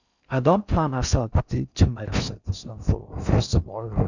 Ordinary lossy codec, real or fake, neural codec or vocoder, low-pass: none; fake; codec, 16 kHz in and 24 kHz out, 0.6 kbps, FocalCodec, streaming, 4096 codes; 7.2 kHz